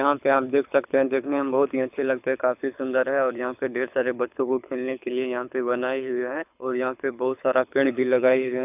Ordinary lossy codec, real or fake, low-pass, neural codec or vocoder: none; fake; 3.6 kHz; codec, 24 kHz, 6 kbps, HILCodec